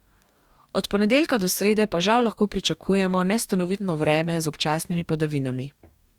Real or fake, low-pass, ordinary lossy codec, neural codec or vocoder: fake; 19.8 kHz; Opus, 64 kbps; codec, 44.1 kHz, 2.6 kbps, DAC